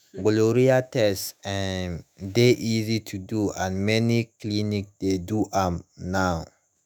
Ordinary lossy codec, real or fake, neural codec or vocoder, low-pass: none; fake; autoencoder, 48 kHz, 128 numbers a frame, DAC-VAE, trained on Japanese speech; none